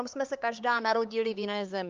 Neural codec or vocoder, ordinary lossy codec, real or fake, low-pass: codec, 16 kHz, 4 kbps, X-Codec, HuBERT features, trained on LibriSpeech; Opus, 32 kbps; fake; 7.2 kHz